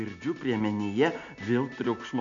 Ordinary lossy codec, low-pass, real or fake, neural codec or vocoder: MP3, 64 kbps; 7.2 kHz; real; none